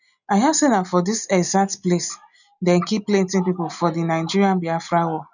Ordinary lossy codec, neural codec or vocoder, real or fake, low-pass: none; none; real; 7.2 kHz